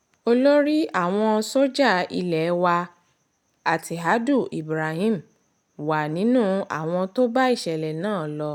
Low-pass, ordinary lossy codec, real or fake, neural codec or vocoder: 19.8 kHz; none; real; none